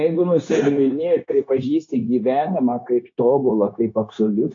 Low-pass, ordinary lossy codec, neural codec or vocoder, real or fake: 7.2 kHz; AAC, 48 kbps; codec, 16 kHz, 0.9 kbps, LongCat-Audio-Codec; fake